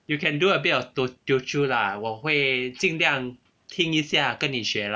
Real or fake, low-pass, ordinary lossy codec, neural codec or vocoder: real; none; none; none